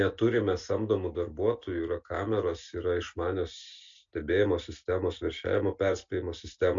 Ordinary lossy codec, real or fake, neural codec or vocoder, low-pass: MP3, 48 kbps; real; none; 7.2 kHz